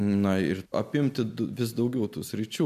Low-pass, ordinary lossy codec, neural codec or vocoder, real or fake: 14.4 kHz; MP3, 96 kbps; none; real